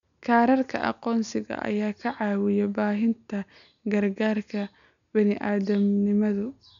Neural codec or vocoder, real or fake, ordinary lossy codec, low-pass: none; real; none; 7.2 kHz